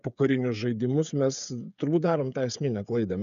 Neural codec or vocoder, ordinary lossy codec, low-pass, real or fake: codec, 16 kHz, 8 kbps, FreqCodec, smaller model; AAC, 96 kbps; 7.2 kHz; fake